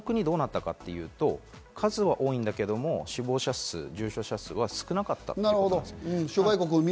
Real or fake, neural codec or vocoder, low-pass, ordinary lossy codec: real; none; none; none